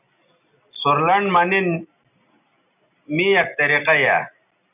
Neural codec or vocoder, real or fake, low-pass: none; real; 3.6 kHz